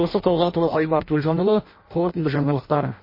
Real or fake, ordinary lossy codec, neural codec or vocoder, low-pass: fake; MP3, 24 kbps; codec, 16 kHz in and 24 kHz out, 0.6 kbps, FireRedTTS-2 codec; 5.4 kHz